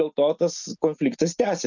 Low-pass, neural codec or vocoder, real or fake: 7.2 kHz; none; real